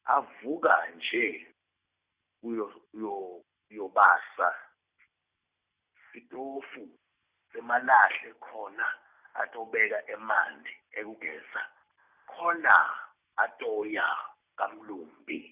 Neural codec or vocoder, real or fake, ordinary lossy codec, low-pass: none; real; Opus, 64 kbps; 3.6 kHz